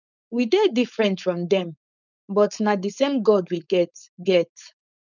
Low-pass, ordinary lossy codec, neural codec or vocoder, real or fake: 7.2 kHz; none; codec, 16 kHz, 4.8 kbps, FACodec; fake